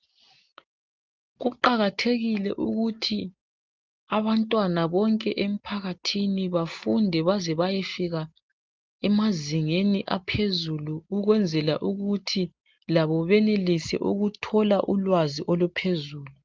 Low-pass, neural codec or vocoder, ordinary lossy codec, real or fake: 7.2 kHz; none; Opus, 24 kbps; real